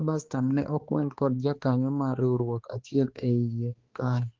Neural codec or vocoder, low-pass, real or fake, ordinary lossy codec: codec, 16 kHz, 2 kbps, X-Codec, HuBERT features, trained on balanced general audio; 7.2 kHz; fake; Opus, 16 kbps